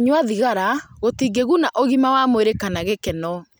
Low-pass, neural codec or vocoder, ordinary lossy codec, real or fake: none; none; none; real